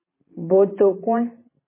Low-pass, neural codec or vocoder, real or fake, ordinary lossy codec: 3.6 kHz; vocoder, 44.1 kHz, 128 mel bands every 512 samples, BigVGAN v2; fake; MP3, 16 kbps